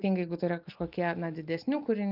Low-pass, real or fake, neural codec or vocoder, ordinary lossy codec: 5.4 kHz; real; none; Opus, 24 kbps